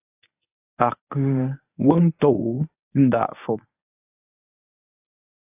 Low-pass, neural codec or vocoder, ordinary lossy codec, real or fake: 3.6 kHz; codec, 24 kHz, 0.9 kbps, WavTokenizer, medium speech release version 1; AAC, 32 kbps; fake